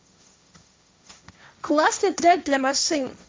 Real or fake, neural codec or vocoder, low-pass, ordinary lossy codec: fake; codec, 16 kHz, 1.1 kbps, Voila-Tokenizer; none; none